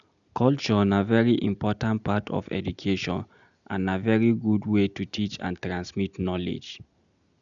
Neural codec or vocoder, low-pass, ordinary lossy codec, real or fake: none; 7.2 kHz; none; real